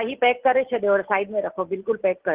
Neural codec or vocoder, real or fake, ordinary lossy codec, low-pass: none; real; Opus, 16 kbps; 3.6 kHz